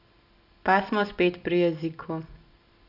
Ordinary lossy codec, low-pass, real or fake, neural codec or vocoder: none; 5.4 kHz; real; none